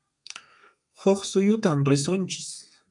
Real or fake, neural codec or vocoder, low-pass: fake; codec, 32 kHz, 1.9 kbps, SNAC; 10.8 kHz